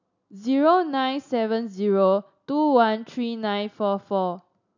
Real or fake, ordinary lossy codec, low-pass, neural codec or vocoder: real; none; 7.2 kHz; none